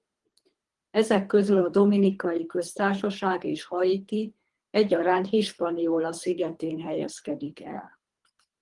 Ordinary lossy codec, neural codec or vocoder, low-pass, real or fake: Opus, 24 kbps; codec, 24 kHz, 3 kbps, HILCodec; 10.8 kHz; fake